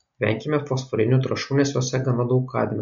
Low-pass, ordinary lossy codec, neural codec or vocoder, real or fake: 7.2 kHz; MP3, 48 kbps; none; real